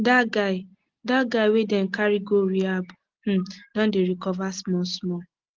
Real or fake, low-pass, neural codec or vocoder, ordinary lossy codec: real; 7.2 kHz; none; Opus, 16 kbps